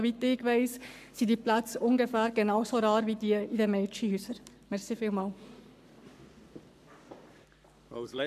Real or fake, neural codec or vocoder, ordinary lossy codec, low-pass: fake; codec, 44.1 kHz, 7.8 kbps, Pupu-Codec; none; 14.4 kHz